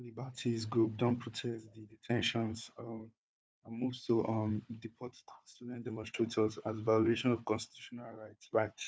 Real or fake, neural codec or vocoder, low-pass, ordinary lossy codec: fake; codec, 16 kHz, 4 kbps, FunCodec, trained on Chinese and English, 50 frames a second; none; none